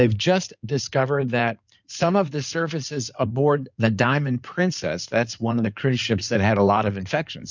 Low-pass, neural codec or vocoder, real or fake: 7.2 kHz; codec, 16 kHz in and 24 kHz out, 2.2 kbps, FireRedTTS-2 codec; fake